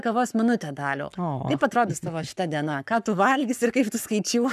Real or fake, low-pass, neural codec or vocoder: fake; 14.4 kHz; codec, 44.1 kHz, 7.8 kbps, Pupu-Codec